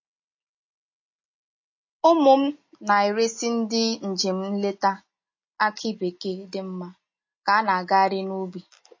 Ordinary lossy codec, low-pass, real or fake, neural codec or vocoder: MP3, 32 kbps; 7.2 kHz; real; none